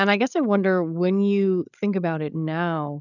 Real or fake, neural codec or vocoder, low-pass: fake; codec, 16 kHz, 8 kbps, FreqCodec, larger model; 7.2 kHz